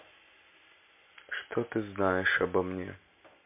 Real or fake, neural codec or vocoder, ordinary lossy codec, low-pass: real; none; MP3, 32 kbps; 3.6 kHz